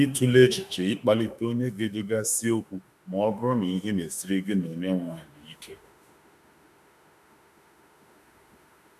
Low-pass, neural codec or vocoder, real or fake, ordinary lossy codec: 14.4 kHz; autoencoder, 48 kHz, 32 numbers a frame, DAC-VAE, trained on Japanese speech; fake; none